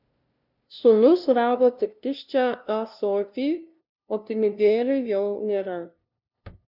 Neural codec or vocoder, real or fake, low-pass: codec, 16 kHz, 0.5 kbps, FunCodec, trained on LibriTTS, 25 frames a second; fake; 5.4 kHz